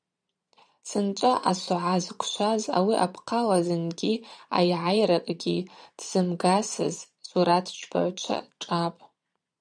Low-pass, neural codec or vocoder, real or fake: 9.9 kHz; vocoder, 22.05 kHz, 80 mel bands, Vocos; fake